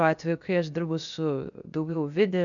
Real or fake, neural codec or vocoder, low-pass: fake; codec, 16 kHz, 0.7 kbps, FocalCodec; 7.2 kHz